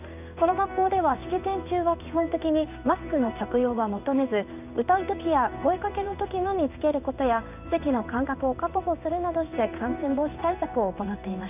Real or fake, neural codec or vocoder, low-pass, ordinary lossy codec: fake; codec, 16 kHz in and 24 kHz out, 1 kbps, XY-Tokenizer; 3.6 kHz; none